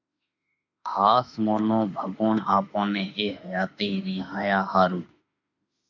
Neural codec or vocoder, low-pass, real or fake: autoencoder, 48 kHz, 32 numbers a frame, DAC-VAE, trained on Japanese speech; 7.2 kHz; fake